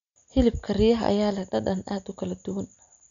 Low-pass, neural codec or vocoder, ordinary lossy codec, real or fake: 7.2 kHz; none; none; real